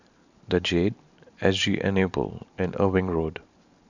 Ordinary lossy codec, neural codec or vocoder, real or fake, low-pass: none; vocoder, 44.1 kHz, 128 mel bands every 512 samples, BigVGAN v2; fake; 7.2 kHz